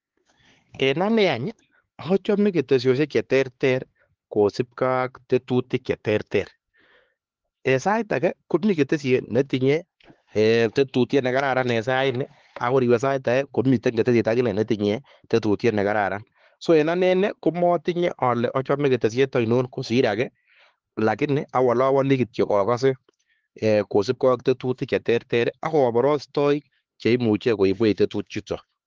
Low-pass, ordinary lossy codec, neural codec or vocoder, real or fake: 7.2 kHz; Opus, 16 kbps; codec, 16 kHz, 4 kbps, X-Codec, HuBERT features, trained on LibriSpeech; fake